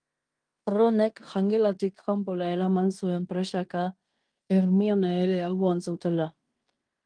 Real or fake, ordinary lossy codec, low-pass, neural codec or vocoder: fake; Opus, 32 kbps; 9.9 kHz; codec, 16 kHz in and 24 kHz out, 0.9 kbps, LongCat-Audio-Codec, fine tuned four codebook decoder